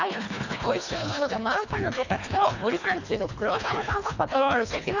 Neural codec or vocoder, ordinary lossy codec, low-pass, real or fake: codec, 24 kHz, 1.5 kbps, HILCodec; none; 7.2 kHz; fake